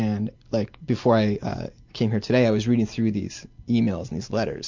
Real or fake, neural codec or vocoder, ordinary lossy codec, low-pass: real; none; MP3, 64 kbps; 7.2 kHz